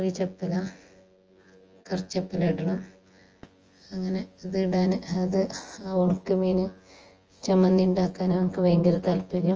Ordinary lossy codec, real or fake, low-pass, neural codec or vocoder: Opus, 24 kbps; fake; 7.2 kHz; vocoder, 24 kHz, 100 mel bands, Vocos